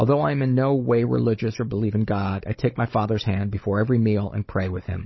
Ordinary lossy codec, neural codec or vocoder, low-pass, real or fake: MP3, 24 kbps; codec, 16 kHz, 16 kbps, FunCodec, trained on Chinese and English, 50 frames a second; 7.2 kHz; fake